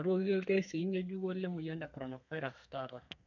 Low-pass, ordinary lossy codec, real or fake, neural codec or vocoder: 7.2 kHz; none; fake; codec, 32 kHz, 1.9 kbps, SNAC